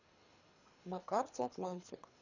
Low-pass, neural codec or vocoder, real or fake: 7.2 kHz; codec, 24 kHz, 3 kbps, HILCodec; fake